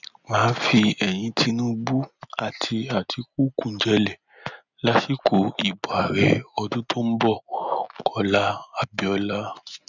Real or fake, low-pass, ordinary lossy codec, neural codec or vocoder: real; 7.2 kHz; none; none